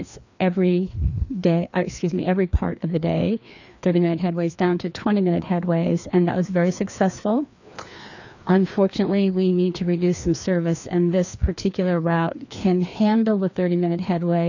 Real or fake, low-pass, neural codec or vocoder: fake; 7.2 kHz; codec, 16 kHz, 2 kbps, FreqCodec, larger model